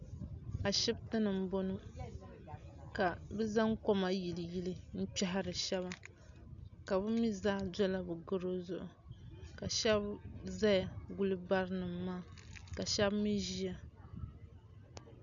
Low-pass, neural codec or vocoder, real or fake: 7.2 kHz; none; real